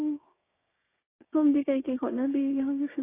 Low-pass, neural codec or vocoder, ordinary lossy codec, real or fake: 3.6 kHz; codec, 16 kHz, 6 kbps, DAC; AAC, 16 kbps; fake